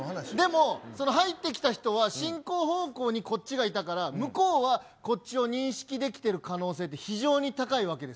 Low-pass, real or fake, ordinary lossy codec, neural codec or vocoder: none; real; none; none